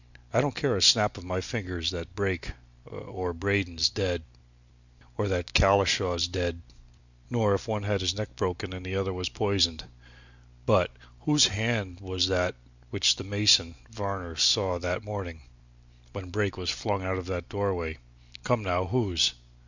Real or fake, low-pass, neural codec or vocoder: real; 7.2 kHz; none